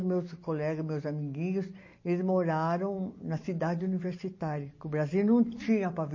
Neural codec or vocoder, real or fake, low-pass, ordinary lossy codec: none; real; 7.2 kHz; MP3, 32 kbps